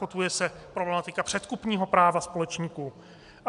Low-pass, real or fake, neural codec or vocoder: 10.8 kHz; real; none